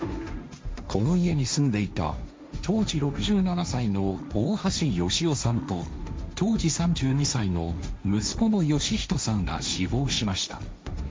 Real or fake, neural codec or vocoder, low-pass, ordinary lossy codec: fake; codec, 16 kHz, 1.1 kbps, Voila-Tokenizer; none; none